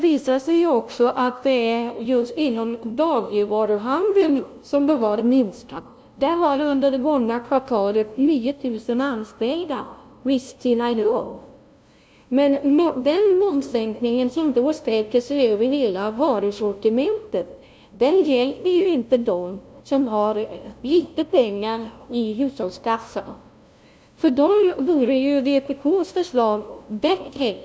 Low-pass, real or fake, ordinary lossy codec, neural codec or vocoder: none; fake; none; codec, 16 kHz, 0.5 kbps, FunCodec, trained on LibriTTS, 25 frames a second